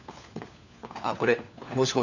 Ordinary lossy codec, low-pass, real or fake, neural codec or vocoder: none; 7.2 kHz; fake; codec, 16 kHz, 4 kbps, FunCodec, trained on LibriTTS, 50 frames a second